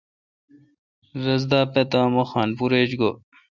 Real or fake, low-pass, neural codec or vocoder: real; 7.2 kHz; none